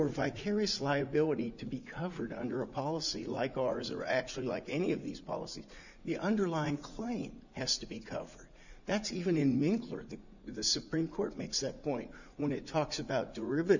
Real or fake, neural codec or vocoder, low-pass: fake; vocoder, 44.1 kHz, 80 mel bands, Vocos; 7.2 kHz